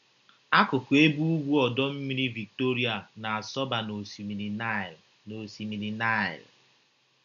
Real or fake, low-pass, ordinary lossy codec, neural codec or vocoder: real; 7.2 kHz; none; none